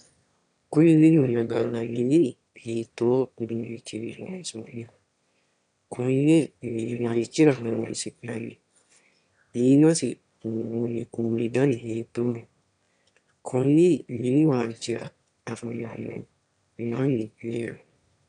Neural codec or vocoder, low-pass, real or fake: autoencoder, 22.05 kHz, a latent of 192 numbers a frame, VITS, trained on one speaker; 9.9 kHz; fake